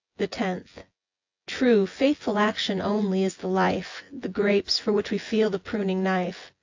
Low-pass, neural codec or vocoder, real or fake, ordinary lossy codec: 7.2 kHz; vocoder, 24 kHz, 100 mel bands, Vocos; fake; AAC, 48 kbps